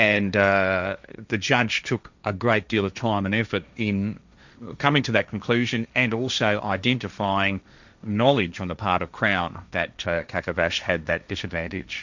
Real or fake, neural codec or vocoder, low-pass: fake; codec, 16 kHz, 1.1 kbps, Voila-Tokenizer; 7.2 kHz